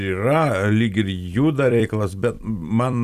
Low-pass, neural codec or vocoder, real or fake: 14.4 kHz; vocoder, 44.1 kHz, 128 mel bands every 256 samples, BigVGAN v2; fake